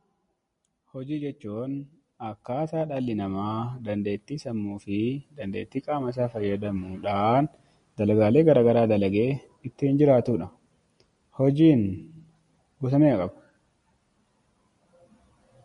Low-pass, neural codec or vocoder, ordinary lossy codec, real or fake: 10.8 kHz; none; MP3, 48 kbps; real